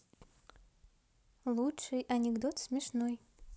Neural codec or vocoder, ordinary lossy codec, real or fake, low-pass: none; none; real; none